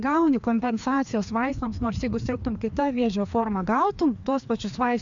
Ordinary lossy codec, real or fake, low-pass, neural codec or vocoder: AAC, 64 kbps; fake; 7.2 kHz; codec, 16 kHz, 2 kbps, FreqCodec, larger model